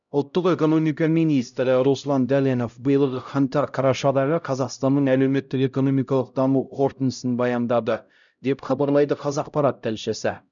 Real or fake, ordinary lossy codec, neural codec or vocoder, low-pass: fake; none; codec, 16 kHz, 0.5 kbps, X-Codec, HuBERT features, trained on LibriSpeech; 7.2 kHz